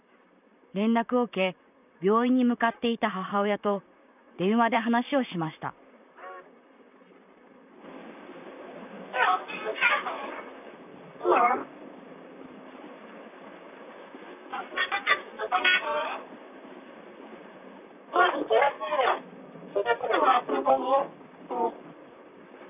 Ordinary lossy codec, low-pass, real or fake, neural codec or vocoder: none; 3.6 kHz; fake; vocoder, 44.1 kHz, 128 mel bands, Pupu-Vocoder